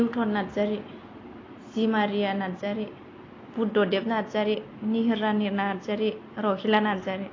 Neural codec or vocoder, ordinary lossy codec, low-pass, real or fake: none; AAC, 32 kbps; 7.2 kHz; real